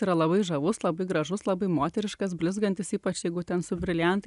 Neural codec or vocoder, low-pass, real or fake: none; 10.8 kHz; real